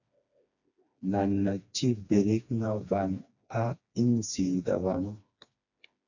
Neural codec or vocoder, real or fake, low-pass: codec, 16 kHz, 2 kbps, FreqCodec, smaller model; fake; 7.2 kHz